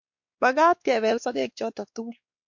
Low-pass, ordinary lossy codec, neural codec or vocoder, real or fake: 7.2 kHz; MP3, 48 kbps; codec, 16 kHz, 2 kbps, X-Codec, WavLM features, trained on Multilingual LibriSpeech; fake